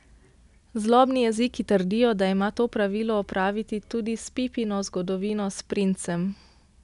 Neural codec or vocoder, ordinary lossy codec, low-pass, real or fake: none; none; 10.8 kHz; real